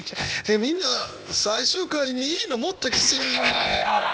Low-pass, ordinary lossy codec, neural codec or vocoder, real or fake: none; none; codec, 16 kHz, 0.8 kbps, ZipCodec; fake